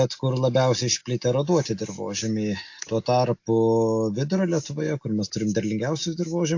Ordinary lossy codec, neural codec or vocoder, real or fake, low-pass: AAC, 48 kbps; none; real; 7.2 kHz